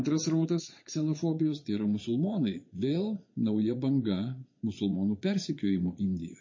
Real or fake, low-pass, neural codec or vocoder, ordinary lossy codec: fake; 7.2 kHz; vocoder, 22.05 kHz, 80 mel bands, Vocos; MP3, 32 kbps